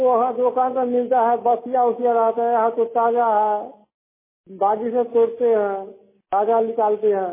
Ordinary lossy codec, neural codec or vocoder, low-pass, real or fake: MP3, 16 kbps; none; 3.6 kHz; real